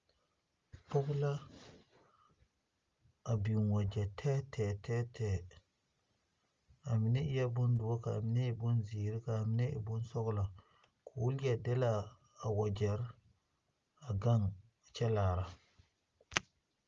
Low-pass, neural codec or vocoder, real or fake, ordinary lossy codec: 7.2 kHz; none; real; Opus, 32 kbps